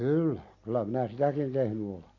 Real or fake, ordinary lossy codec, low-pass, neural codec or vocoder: real; AAC, 48 kbps; 7.2 kHz; none